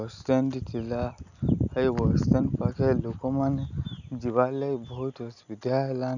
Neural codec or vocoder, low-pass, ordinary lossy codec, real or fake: none; 7.2 kHz; none; real